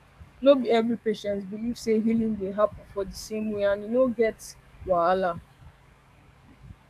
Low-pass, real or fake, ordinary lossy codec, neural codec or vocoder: 14.4 kHz; fake; none; codec, 44.1 kHz, 7.8 kbps, DAC